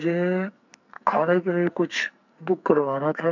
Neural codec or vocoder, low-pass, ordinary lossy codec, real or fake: codec, 44.1 kHz, 2.6 kbps, SNAC; 7.2 kHz; none; fake